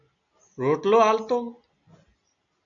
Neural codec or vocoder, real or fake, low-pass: none; real; 7.2 kHz